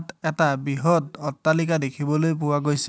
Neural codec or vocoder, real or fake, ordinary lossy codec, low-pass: none; real; none; none